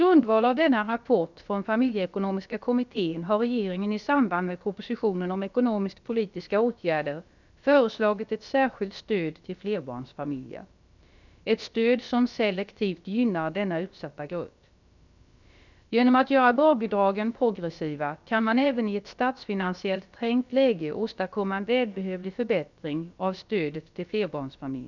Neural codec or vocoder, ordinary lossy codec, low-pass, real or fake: codec, 16 kHz, about 1 kbps, DyCAST, with the encoder's durations; none; 7.2 kHz; fake